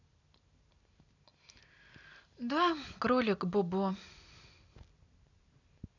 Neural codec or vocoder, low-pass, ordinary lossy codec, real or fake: none; 7.2 kHz; none; real